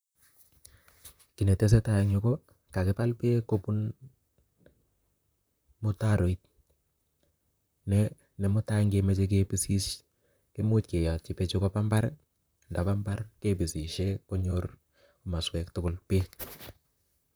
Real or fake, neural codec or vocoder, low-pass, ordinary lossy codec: fake; vocoder, 44.1 kHz, 128 mel bands, Pupu-Vocoder; none; none